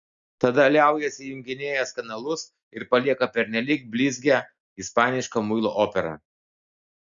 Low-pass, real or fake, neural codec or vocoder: 7.2 kHz; real; none